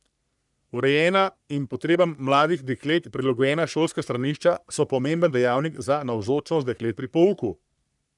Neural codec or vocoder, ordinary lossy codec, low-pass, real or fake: codec, 44.1 kHz, 3.4 kbps, Pupu-Codec; none; 10.8 kHz; fake